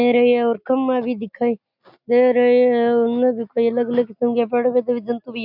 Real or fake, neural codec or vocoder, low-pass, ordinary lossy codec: real; none; 5.4 kHz; MP3, 48 kbps